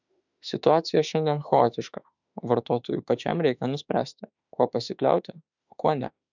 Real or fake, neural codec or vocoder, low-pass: fake; autoencoder, 48 kHz, 32 numbers a frame, DAC-VAE, trained on Japanese speech; 7.2 kHz